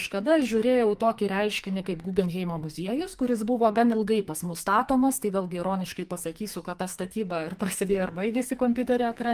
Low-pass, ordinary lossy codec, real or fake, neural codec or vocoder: 14.4 kHz; Opus, 32 kbps; fake; codec, 44.1 kHz, 2.6 kbps, SNAC